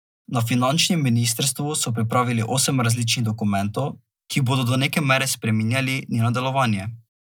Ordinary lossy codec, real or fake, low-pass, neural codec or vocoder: none; real; none; none